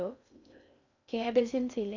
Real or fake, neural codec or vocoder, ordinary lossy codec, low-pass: fake; codec, 16 kHz in and 24 kHz out, 0.6 kbps, FocalCodec, streaming, 4096 codes; none; 7.2 kHz